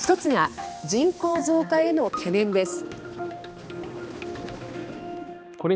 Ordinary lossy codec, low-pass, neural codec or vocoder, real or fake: none; none; codec, 16 kHz, 2 kbps, X-Codec, HuBERT features, trained on balanced general audio; fake